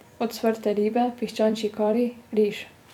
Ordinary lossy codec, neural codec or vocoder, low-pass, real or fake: none; vocoder, 44.1 kHz, 128 mel bands every 256 samples, BigVGAN v2; 19.8 kHz; fake